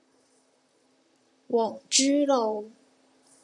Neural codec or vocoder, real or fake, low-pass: vocoder, 44.1 kHz, 128 mel bands, Pupu-Vocoder; fake; 10.8 kHz